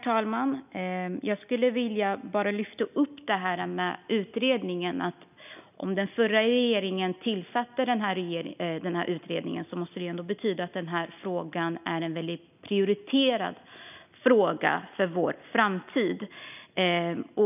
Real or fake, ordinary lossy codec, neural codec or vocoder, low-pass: real; none; none; 3.6 kHz